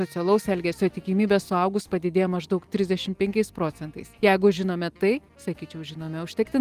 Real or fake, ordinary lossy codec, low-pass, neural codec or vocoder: real; Opus, 24 kbps; 14.4 kHz; none